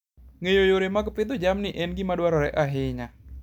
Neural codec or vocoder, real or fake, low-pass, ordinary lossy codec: none; real; 19.8 kHz; none